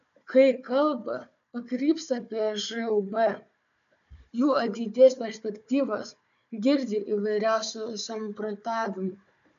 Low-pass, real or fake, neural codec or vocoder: 7.2 kHz; fake; codec, 16 kHz, 4 kbps, FunCodec, trained on Chinese and English, 50 frames a second